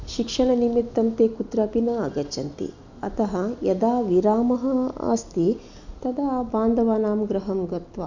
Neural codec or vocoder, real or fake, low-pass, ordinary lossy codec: none; real; 7.2 kHz; none